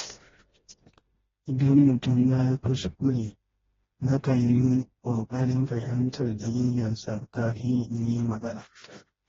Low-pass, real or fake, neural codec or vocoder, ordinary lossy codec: 7.2 kHz; fake; codec, 16 kHz, 1 kbps, FreqCodec, smaller model; AAC, 24 kbps